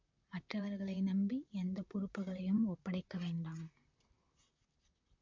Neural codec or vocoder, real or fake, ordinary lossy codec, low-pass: vocoder, 44.1 kHz, 128 mel bands, Pupu-Vocoder; fake; MP3, 48 kbps; 7.2 kHz